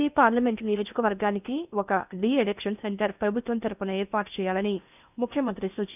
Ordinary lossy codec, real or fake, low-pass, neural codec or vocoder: none; fake; 3.6 kHz; codec, 16 kHz in and 24 kHz out, 0.8 kbps, FocalCodec, streaming, 65536 codes